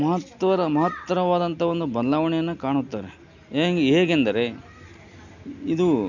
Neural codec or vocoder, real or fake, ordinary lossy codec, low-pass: none; real; none; 7.2 kHz